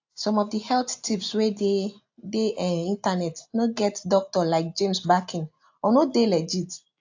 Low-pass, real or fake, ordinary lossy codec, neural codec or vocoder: 7.2 kHz; real; AAC, 48 kbps; none